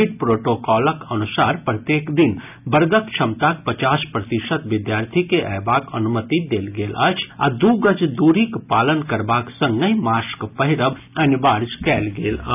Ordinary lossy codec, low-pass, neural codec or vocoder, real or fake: none; 3.6 kHz; none; real